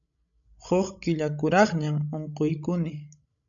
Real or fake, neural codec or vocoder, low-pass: fake; codec, 16 kHz, 16 kbps, FreqCodec, larger model; 7.2 kHz